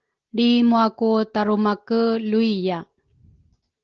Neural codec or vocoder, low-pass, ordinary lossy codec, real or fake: none; 7.2 kHz; Opus, 16 kbps; real